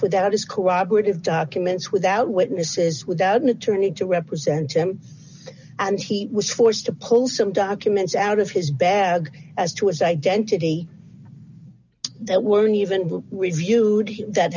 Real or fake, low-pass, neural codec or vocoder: real; 7.2 kHz; none